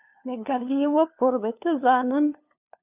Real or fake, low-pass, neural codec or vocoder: fake; 3.6 kHz; codec, 16 kHz, 2 kbps, FunCodec, trained on LibriTTS, 25 frames a second